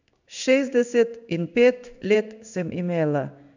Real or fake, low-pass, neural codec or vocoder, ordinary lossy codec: fake; 7.2 kHz; codec, 16 kHz in and 24 kHz out, 1 kbps, XY-Tokenizer; none